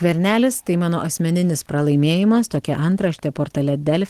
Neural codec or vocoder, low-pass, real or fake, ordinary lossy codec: none; 14.4 kHz; real; Opus, 16 kbps